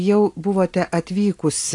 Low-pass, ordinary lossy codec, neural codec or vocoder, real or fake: 10.8 kHz; AAC, 48 kbps; none; real